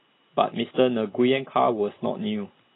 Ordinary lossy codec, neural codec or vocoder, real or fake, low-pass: AAC, 16 kbps; none; real; 7.2 kHz